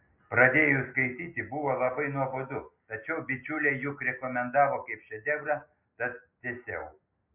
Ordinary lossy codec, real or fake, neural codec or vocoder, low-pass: MP3, 32 kbps; real; none; 3.6 kHz